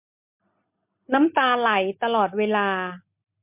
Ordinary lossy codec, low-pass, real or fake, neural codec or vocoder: MP3, 32 kbps; 3.6 kHz; real; none